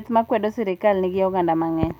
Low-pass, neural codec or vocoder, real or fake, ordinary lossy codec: 19.8 kHz; none; real; none